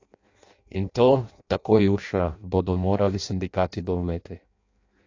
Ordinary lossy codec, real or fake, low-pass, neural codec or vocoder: AAC, 48 kbps; fake; 7.2 kHz; codec, 16 kHz in and 24 kHz out, 0.6 kbps, FireRedTTS-2 codec